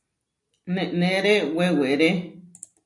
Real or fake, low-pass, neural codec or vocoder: real; 10.8 kHz; none